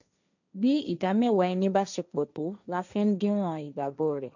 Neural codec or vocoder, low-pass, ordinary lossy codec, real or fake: codec, 16 kHz, 1.1 kbps, Voila-Tokenizer; 7.2 kHz; none; fake